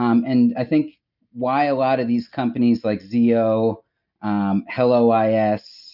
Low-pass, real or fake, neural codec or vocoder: 5.4 kHz; real; none